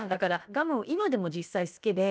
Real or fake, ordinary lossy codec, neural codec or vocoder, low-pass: fake; none; codec, 16 kHz, about 1 kbps, DyCAST, with the encoder's durations; none